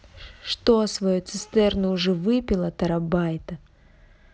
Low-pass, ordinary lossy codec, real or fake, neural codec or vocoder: none; none; real; none